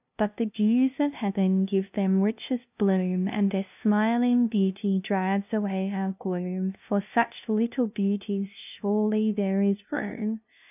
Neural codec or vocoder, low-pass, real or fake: codec, 16 kHz, 0.5 kbps, FunCodec, trained on LibriTTS, 25 frames a second; 3.6 kHz; fake